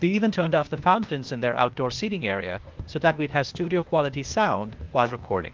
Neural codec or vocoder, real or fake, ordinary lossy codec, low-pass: codec, 16 kHz, 0.8 kbps, ZipCodec; fake; Opus, 24 kbps; 7.2 kHz